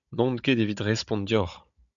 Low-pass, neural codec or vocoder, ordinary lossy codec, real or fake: 7.2 kHz; codec, 16 kHz, 16 kbps, FunCodec, trained on Chinese and English, 50 frames a second; MP3, 96 kbps; fake